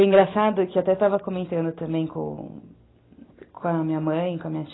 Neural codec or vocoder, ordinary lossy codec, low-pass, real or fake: none; AAC, 16 kbps; 7.2 kHz; real